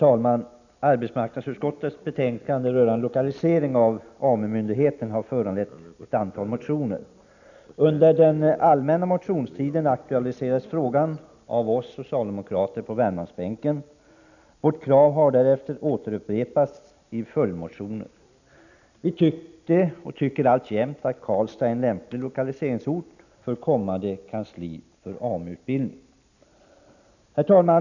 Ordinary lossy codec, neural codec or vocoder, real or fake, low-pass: none; none; real; 7.2 kHz